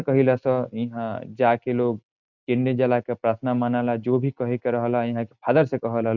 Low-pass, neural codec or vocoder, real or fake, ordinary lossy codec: 7.2 kHz; none; real; none